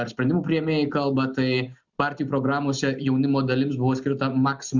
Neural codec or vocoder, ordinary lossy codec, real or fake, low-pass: none; Opus, 64 kbps; real; 7.2 kHz